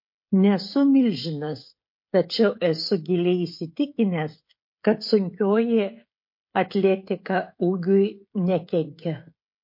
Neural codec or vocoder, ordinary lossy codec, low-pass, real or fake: codec, 16 kHz, 16 kbps, FreqCodec, smaller model; MP3, 32 kbps; 5.4 kHz; fake